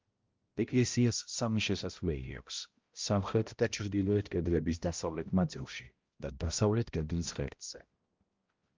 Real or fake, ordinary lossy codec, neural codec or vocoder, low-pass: fake; Opus, 32 kbps; codec, 16 kHz, 0.5 kbps, X-Codec, HuBERT features, trained on balanced general audio; 7.2 kHz